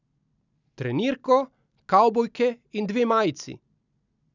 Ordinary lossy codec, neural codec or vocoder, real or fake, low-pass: none; none; real; 7.2 kHz